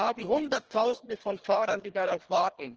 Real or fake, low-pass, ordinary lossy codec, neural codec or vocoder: fake; 7.2 kHz; Opus, 16 kbps; codec, 24 kHz, 1.5 kbps, HILCodec